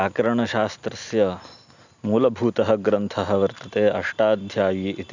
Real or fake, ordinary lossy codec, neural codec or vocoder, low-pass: real; none; none; 7.2 kHz